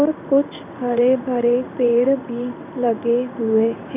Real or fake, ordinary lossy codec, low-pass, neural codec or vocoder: fake; none; 3.6 kHz; codec, 16 kHz in and 24 kHz out, 1 kbps, XY-Tokenizer